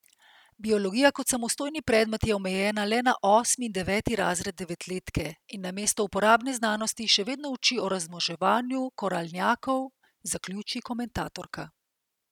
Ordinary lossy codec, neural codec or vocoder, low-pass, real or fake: none; none; 19.8 kHz; real